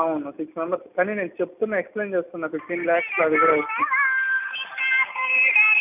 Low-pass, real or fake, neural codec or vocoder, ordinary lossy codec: 3.6 kHz; real; none; none